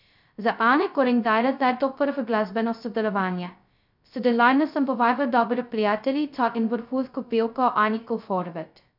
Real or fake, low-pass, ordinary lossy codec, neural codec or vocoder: fake; 5.4 kHz; none; codec, 16 kHz, 0.2 kbps, FocalCodec